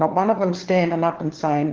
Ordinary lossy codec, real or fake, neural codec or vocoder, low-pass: Opus, 16 kbps; fake; codec, 16 kHz, 4 kbps, FunCodec, trained on LibriTTS, 50 frames a second; 7.2 kHz